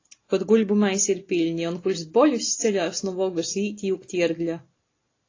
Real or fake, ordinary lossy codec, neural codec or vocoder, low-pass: real; AAC, 32 kbps; none; 7.2 kHz